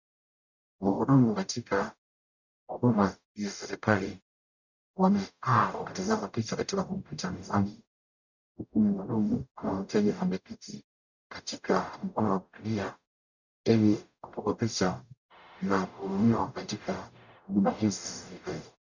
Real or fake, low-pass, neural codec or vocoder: fake; 7.2 kHz; codec, 44.1 kHz, 0.9 kbps, DAC